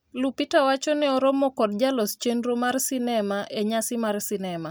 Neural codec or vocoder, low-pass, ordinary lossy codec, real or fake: none; none; none; real